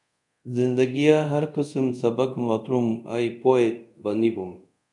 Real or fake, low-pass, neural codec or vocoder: fake; 10.8 kHz; codec, 24 kHz, 0.5 kbps, DualCodec